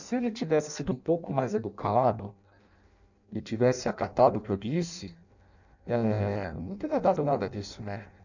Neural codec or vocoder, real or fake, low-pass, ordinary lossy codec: codec, 16 kHz in and 24 kHz out, 0.6 kbps, FireRedTTS-2 codec; fake; 7.2 kHz; none